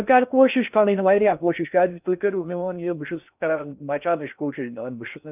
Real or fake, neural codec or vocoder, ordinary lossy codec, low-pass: fake; codec, 16 kHz in and 24 kHz out, 0.6 kbps, FocalCodec, streaming, 4096 codes; none; 3.6 kHz